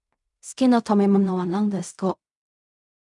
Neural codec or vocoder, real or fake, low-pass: codec, 16 kHz in and 24 kHz out, 0.4 kbps, LongCat-Audio-Codec, fine tuned four codebook decoder; fake; 10.8 kHz